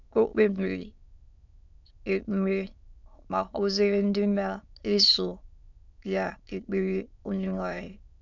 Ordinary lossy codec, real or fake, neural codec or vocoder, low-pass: none; fake; autoencoder, 22.05 kHz, a latent of 192 numbers a frame, VITS, trained on many speakers; 7.2 kHz